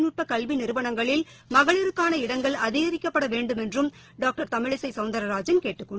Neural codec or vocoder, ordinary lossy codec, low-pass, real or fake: none; Opus, 16 kbps; 7.2 kHz; real